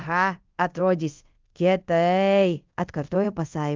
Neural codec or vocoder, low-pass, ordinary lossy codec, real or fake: codec, 24 kHz, 0.5 kbps, DualCodec; 7.2 kHz; Opus, 24 kbps; fake